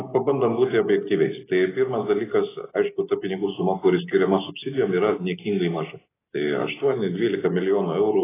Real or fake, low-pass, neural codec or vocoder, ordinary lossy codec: real; 3.6 kHz; none; AAC, 16 kbps